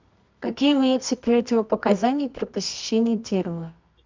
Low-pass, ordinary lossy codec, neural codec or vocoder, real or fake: 7.2 kHz; MP3, 64 kbps; codec, 24 kHz, 0.9 kbps, WavTokenizer, medium music audio release; fake